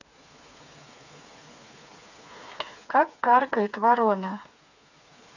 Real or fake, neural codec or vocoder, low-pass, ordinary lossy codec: fake; codec, 16 kHz, 4 kbps, FreqCodec, smaller model; 7.2 kHz; none